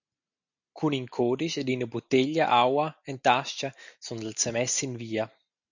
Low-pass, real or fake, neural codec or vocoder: 7.2 kHz; real; none